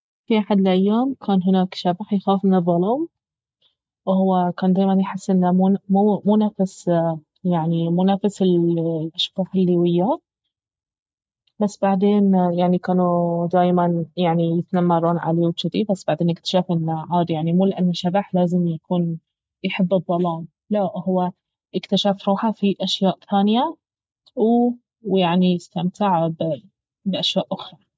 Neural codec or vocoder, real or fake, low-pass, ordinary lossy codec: none; real; none; none